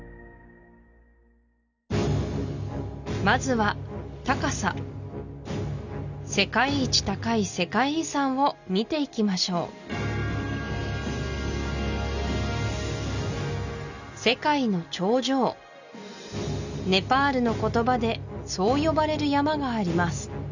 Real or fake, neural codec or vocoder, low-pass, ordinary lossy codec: real; none; 7.2 kHz; none